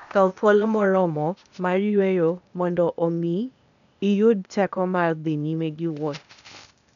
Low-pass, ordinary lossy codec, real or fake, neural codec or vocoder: 7.2 kHz; none; fake; codec, 16 kHz, 0.8 kbps, ZipCodec